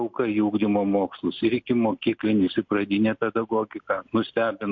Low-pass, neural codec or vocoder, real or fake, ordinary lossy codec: 7.2 kHz; none; real; MP3, 48 kbps